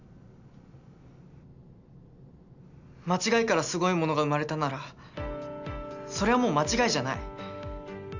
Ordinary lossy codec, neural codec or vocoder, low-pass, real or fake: none; none; 7.2 kHz; real